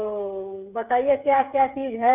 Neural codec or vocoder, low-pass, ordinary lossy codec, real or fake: codec, 16 kHz, 8 kbps, FreqCodec, smaller model; 3.6 kHz; none; fake